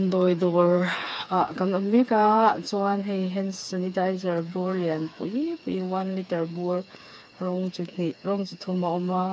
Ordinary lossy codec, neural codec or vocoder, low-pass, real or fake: none; codec, 16 kHz, 4 kbps, FreqCodec, smaller model; none; fake